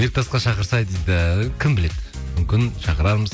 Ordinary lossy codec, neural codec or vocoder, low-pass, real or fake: none; none; none; real